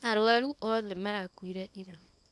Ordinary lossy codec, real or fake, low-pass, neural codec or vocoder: none; fake; none; codec, 24 kHz, 0.9 kbps, WavTokenizer, small release